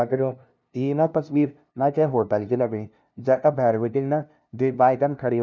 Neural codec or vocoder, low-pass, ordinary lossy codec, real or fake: codec, 16 kHz, 0.5 kbps, FunCodec, trained on LibriTTS, 25 frames a second; none; none; fake